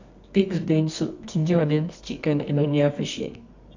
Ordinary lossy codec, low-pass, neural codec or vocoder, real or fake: MP3, 64 kbps; 7.2 kHz; codec, 24 kHz, 0.9 kbps, WavTokenizer, medium music audio release; fake